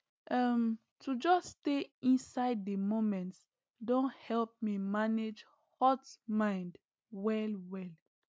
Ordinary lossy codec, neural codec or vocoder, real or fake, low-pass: none; none; real; none